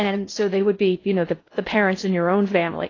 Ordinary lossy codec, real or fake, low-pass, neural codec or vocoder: AAC, 32 kbps; fake; 7.2 kHz; codec, 16 kHz in and 24 kHz out, 0.6 kbps, FocalCodec, streaming, 2048 codes